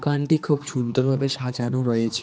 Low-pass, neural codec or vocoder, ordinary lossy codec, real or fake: none; codec, 16 kHz, 2 kbps, X-Codec, HuBERT features, trained on general audio; none; fake